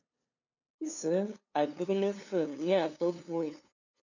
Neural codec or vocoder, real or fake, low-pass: codec, 16 kHz, 2 kbps, FunCodec, trained on LibriTTS, 25 frames a second; fake; 7.2 kHz